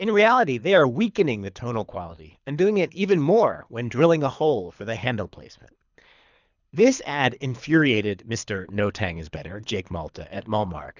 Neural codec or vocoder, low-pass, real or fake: codec, 24 kHz, 3 kbps, HILCodec; 7.2 kHz; fake